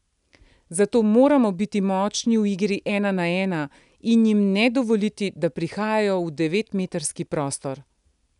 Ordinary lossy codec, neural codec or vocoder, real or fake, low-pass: none; none; real; 10.8 kHz